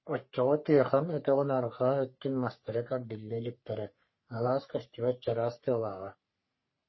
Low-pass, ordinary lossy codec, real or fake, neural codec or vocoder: 7.2 kHz; MP3, 24 kbps; fake; codec, 44.1 kHz, 3.4 kbps, Pupu-Codec